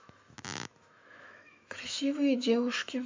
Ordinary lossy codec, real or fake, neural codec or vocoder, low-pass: MP3, 64 kbps; fake; autoencoder, 48 kHz, 128 numbers a frame, DAC-VAE, trained on Japanese speech; 7.2 kHz